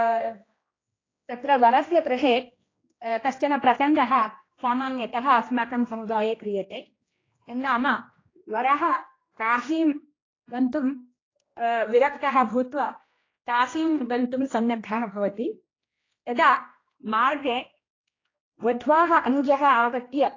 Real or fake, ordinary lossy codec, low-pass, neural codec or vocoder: fake; AAC, 32 kbps; 7.2 kHz; codec, 16 kHz, 1 kbps, X-Codec, HuBERT features, trained on general audio